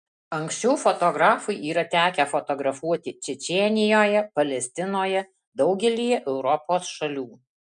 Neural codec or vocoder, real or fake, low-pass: none; real; 9.9 kHz